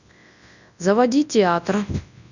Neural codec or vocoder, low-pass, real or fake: codec, 24 kHz, 0.9 kbps, WavTokenizer, large speech release; 7.2 kHz; fake